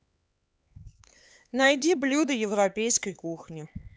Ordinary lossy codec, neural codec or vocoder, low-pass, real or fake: none; codec, 16 kHz, 4 kbps, X-Codec, HuBERT features, trained on LibriSpeech; none; fake